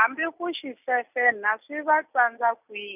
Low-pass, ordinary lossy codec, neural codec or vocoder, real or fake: 3.6 kHz; none; none; real